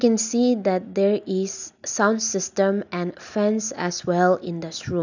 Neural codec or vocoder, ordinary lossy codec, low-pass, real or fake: none; none; 7.2 kHz; real